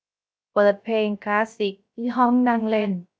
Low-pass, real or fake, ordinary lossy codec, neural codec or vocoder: none; fake; none; codec, 16 kHz, 0.3 kbps, FocalCodec